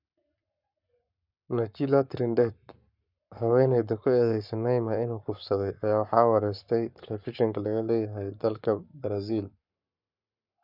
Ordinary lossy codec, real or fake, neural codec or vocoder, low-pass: none; fake; codec, 44.1 kHz, 7.8 kbps, Pupu-Codec; 5.4 kHz